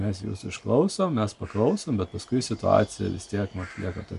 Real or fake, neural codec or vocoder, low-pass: real; none; 10.8 kHz